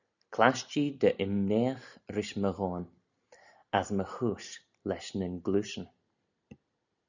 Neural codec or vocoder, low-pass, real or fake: none; 7.2 kHz; real